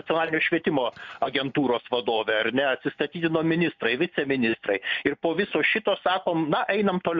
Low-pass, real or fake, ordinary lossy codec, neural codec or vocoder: 7.2 kHz; real; MP3, 64 kbps; none